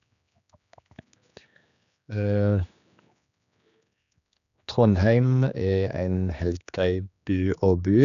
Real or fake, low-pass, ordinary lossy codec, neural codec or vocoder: fake; 7.2 kHz; none; codec, 16 kHz, 2 kbps, X-Codec, HuBERT features, trained on general audio